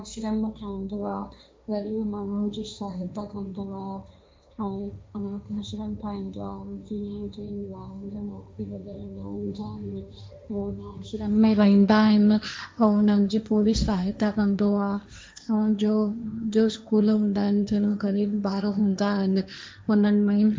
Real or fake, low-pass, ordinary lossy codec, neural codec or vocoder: fake; none; none; codec, 16 kHz, 1.1 kbps, Voila-Tokenizer